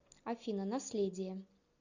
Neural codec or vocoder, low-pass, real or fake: none; 7.2 kHz; real